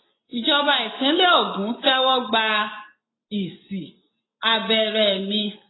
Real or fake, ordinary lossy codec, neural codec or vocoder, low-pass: real; AAC, 16 kbps; none; 7.2 kHz